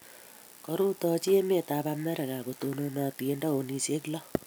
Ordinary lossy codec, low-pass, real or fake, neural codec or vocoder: none; none; real; none